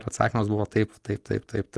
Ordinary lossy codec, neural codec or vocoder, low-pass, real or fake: Opus, 24 kbps; none; 10.8 kHz; real